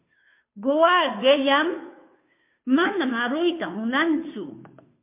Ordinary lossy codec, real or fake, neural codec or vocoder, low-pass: MP3, 24 kbps; fake; codec, 16 kHz, 2 kbps, FunCodec, trained on Chinese and English, 25 frames a second; 3.6 kHz